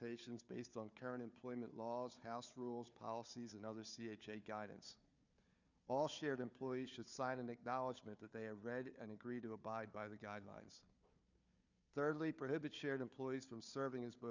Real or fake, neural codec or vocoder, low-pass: fake; codec, 16 kHz, 4 kbps, FunCodec, trained on Chinese and English, 50 frames a second; 7.2 kHz